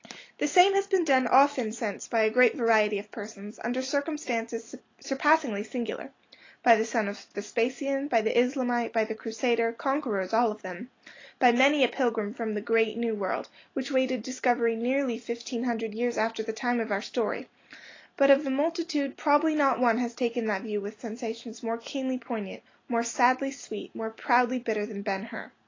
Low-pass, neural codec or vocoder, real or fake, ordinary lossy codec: 7.2 kHz; none; real; AAC, 32 kbps